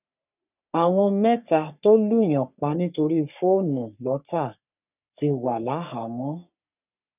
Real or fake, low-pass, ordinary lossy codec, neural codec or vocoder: fake; 3.6 kHz; none; codec, 44.1 kHz, 3.4 kbps, Pupu-Codec